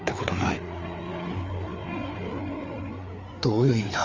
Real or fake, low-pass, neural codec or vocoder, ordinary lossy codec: fake; 7.2 kHz; codec, 16 kHz, 4 kbps, FreqCodec, larger model; Opus, 32 kbps